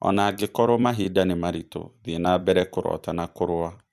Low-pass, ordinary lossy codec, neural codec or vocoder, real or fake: 14.4 kHz; none; vocoder, 44.1 kHz, 128 mel bands every 256 samples, BigVGAN v2; fake